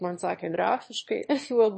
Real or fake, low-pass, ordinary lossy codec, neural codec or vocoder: fake; 9.9 kHz; MP3, 32 kbps; autoencoder, 22.05 kHz, a latent of 192 numbers a frame, VITS, trained on one speaker